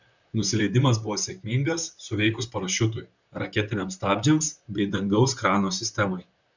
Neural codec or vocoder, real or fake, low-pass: vocoder, 44.1 kHz, 128 mel bands, Pupu-Vocoder; fake; 7.2 kHz